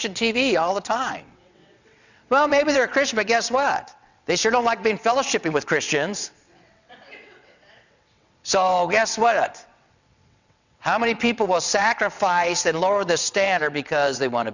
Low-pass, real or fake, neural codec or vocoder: 7.2 kHz; fake; vocoder, 22.05 kHz, 80 mel bands, WaveNeXt